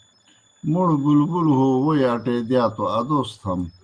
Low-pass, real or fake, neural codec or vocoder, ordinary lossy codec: 9.9 kHz; real; none; Opus, 24 kbps